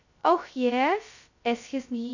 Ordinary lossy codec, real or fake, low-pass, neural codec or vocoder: none; fake; 7.2 kHz; codec, 16 kHz, 0.2 kbps, FocalCodec